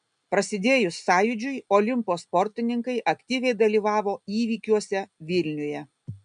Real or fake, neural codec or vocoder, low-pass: real; none; 9.9 kHz